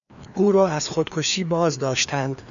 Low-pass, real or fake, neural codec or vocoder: 7.2 kHz; fake; codec, 16 kHz, 2 kbps, FreqCodec, larger model